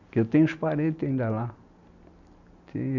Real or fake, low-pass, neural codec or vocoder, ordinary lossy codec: real; 7.2 kHz; none; none